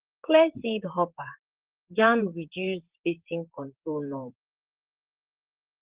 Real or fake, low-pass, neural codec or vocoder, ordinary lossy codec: fake; 3.6 kHz; vocoder, 44.1 kHz, 128 mel bands, Pupu-Vocoder; Opus, 16 kbps